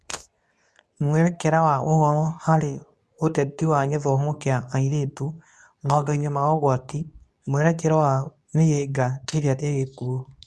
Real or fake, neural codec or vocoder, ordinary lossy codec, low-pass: fake; codec, 24 kHz, 0.9 kbps, WavTokenizer, medium speech release version 2; none; none